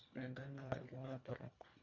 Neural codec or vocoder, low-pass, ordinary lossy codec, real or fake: codec, 24 kHz, 1.5 kbps, HILCodec; 7.2 kHz; none; fake